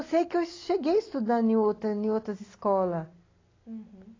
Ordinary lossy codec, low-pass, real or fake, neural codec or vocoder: AAC, 32 kbps; 7.2 kHz; real; none